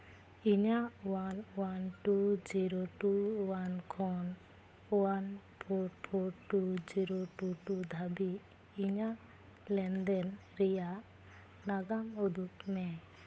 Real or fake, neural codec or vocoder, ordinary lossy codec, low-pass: fake; codec, 16 kHz, 8 kbps, FunCodec, trained on Chinese and English, 25 frames a second; none; none